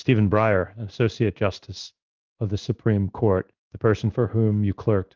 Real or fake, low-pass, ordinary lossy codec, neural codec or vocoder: fake; 7.2 kHz; Opus, 32 kbps; codec, 16 kHz in and 24 kHz out, 1 kbps, XY-Tokenizer